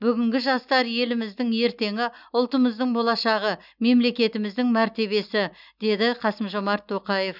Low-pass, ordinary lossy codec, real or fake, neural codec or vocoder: 5.4 kHz; none; real; none